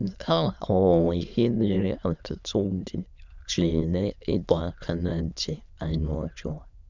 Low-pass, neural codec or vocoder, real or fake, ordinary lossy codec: 7.2 kHz; autoencoder, 22.05 kHz, a latent of 192 numbers a frame, VITS, trained on many speakers; fake; none